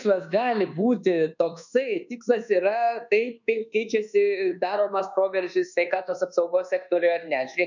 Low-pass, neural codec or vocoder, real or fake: 7.2 kHz; codec, 24 kHz, 1.2 kbps, DualCodec; fake